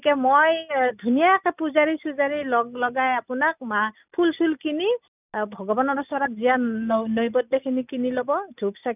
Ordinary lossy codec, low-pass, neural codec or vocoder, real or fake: none; 3.6 kHz; none; real